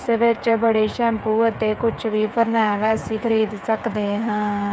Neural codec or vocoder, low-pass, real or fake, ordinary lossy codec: codec, 16 kHz, 8 kbps, FreqCodec, smaller model; none; fake; none